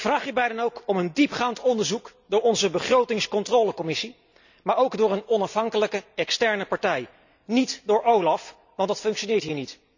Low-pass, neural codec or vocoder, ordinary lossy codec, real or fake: 7.2 kHz; none; none; real